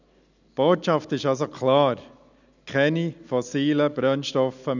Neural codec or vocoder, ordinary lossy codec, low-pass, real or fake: none; none; 7.2 kHz; real